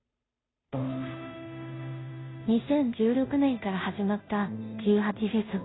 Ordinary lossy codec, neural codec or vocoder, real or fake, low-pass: AAC, 16 kbps; codec, 16 kHz, 0.5 kbps, FunCodec, trained on Chinese and English, 25 frames a second; fake; 7.2 kHz